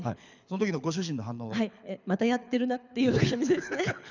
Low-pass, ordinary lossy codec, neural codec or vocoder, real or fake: 7.2 kHz; none; codec, 24 kHz, 6 kbps, HILCodec; fake